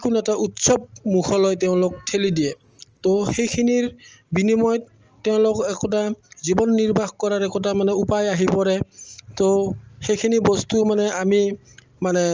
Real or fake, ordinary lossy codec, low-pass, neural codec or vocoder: real; Opus, 24 kbps; 7.2 kHz; none